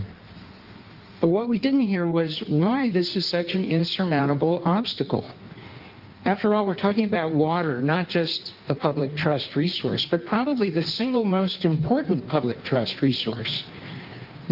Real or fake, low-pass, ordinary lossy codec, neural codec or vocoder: fake; 5.4 kHz; Opus, 24 kbps; codec, 16 kHz in and 24 kHz out, 1.1 kbps, FireRedTTS-2 codec